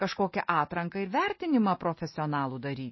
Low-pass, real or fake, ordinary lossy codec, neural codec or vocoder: 7.2 kHz; real; MP3, 24 kbps; none